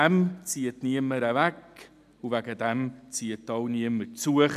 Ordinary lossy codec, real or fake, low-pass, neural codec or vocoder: none; real; 14.4 kHz; none